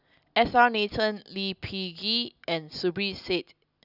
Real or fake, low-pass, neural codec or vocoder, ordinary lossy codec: real; 5.4 kHz; none; none